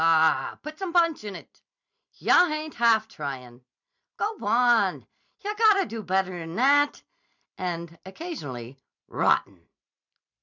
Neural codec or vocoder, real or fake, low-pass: vocoder, 44.1 kHz, 128 mel bands every 256 samples, BigVGAN v2; fake; 7.2 kHz